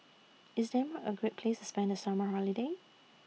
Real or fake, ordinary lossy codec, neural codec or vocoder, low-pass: real; none; none; none